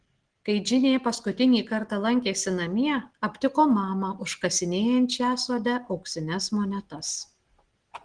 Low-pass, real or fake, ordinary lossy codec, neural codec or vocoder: 9.9 kHz; real; Opus, 16 kbps; none